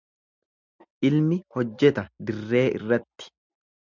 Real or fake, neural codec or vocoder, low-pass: real; none; 7.2 kHz